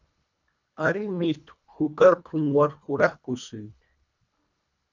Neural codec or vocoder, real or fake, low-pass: codec, 24 kHz, 1.5 kbps, HILCodec; fake; 7.2 kHz